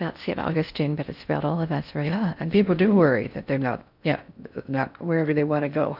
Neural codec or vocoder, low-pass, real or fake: codec, 16 kHz in and 24 kHz out, 0.6 kbps, FocalCodec, streaming, 4096 codes; 5.4 kHz; fake